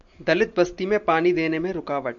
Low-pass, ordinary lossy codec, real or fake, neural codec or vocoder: 7.2 kHz; MP3, 64 kbps; real; none